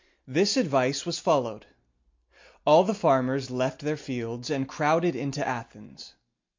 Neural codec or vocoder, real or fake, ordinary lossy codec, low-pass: none; real; MP3, 48 kbps; 7.2 kHz